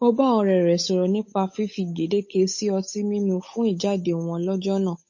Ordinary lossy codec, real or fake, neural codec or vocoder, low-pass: MP3, 32 kbps; fake; codec, 16 kHz, 8 kbps, FunCodec, trained on Chinese and English, 25 frames a second; 7.2 kHz